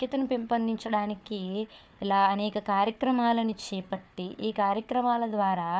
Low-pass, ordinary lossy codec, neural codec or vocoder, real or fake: none; none; codec, 16 kHz, 4 kbps, FunCodec, trained on Chinese and English, 50 frames a second; fake